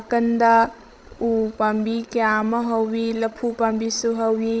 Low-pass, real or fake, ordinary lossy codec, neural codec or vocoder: none; fake; none; codec, 16 kHz, 16 kbps, FreqCodec, larger model